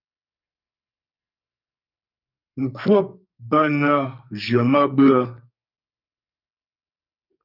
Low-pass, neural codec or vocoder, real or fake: 5.4 kHz; codec, 44.1 kHz, 2.6 kbps, SNAC; fake